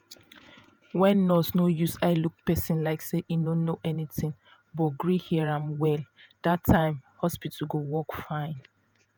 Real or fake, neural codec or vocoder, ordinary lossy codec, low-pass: fake; vocoder, 48 kHz, 128 mel bands, Vocos; none; none